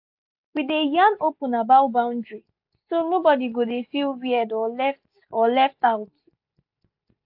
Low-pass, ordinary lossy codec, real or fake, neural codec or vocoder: 5.4 kHz; AAC, 48 kbps; real; none